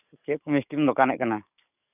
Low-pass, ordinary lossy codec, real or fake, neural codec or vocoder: 3.6 kHz; none; fake; codec, 44.1 kHz, 7.8 kbps, DAC